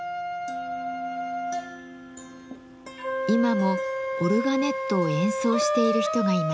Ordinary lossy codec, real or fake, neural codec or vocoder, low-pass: none; real; none; none